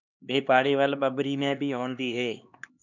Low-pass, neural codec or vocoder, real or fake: 7.2 kHz; codec, 16 kHz, 2 kbps, X-Codec, HuBERT features, trained on LibriSpeech; fake